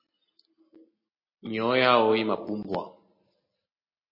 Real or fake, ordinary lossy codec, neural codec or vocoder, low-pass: real; MP3, 24 kbps; none; 5.4 kHz